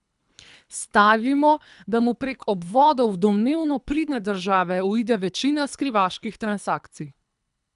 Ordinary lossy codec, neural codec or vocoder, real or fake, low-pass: none; codec, 24 kHz, 3 kbps, HILCodec; fake; 10.8 kHz